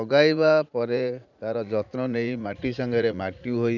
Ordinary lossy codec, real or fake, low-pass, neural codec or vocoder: none; fake; 7.2 kHz; vocoder, 44.1 kHz, 80 mel bands, Vocos